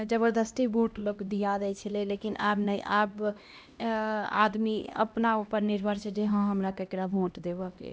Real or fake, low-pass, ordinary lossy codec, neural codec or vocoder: fake; none; none; codec, 16 kHz, 1 kbps, X-Codec, HuBERT features, trained on LibriSpeech